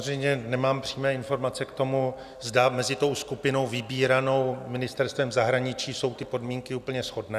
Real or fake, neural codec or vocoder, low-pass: real; none; 14.4 kHz